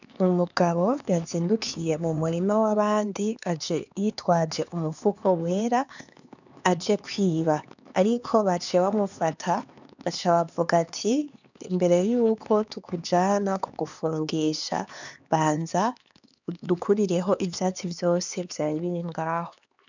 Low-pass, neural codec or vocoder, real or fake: 7.2 kHz; codec, 16 kHz, 4 kbps, X-Codec, HuBERT features, trained on LibriSpeech; fake